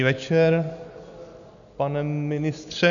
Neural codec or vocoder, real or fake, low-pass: none; real; 7.2 kHz